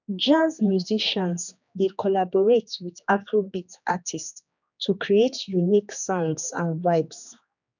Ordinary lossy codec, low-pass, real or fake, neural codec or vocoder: none; 7.2 kHz; fake; codec, 16 kHz, 2 kbps, X-Codec, HuBERT features, trained on general audio